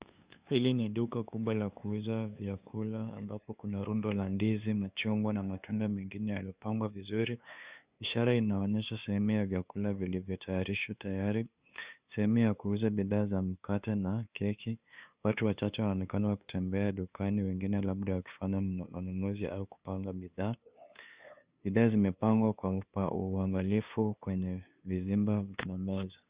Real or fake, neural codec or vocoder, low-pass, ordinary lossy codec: fake; codec, 16 kHz, 2 kbps, FunCodec, trained on LibriTTS, 25 frames a second; 3.6 kHz; Opus, 64 kbps